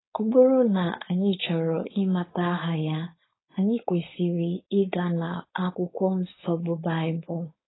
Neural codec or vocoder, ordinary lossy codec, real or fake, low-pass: codec, 16 kHz, 4.8 kbps, FACodec; AAC, 16 kbps; fake; 7.2 kHz